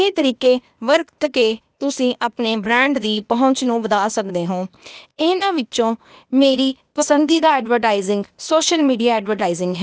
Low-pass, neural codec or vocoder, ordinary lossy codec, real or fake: none; codec, 16 kHz, 0.8 kbps, ZipCodec; none; fake